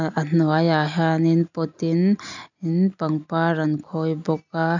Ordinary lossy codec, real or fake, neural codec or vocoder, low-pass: none; real; none; 7.2 kHz